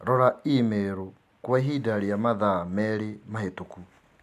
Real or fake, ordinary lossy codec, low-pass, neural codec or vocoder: real; MP3, 96 kbps; 14.4 kHz; none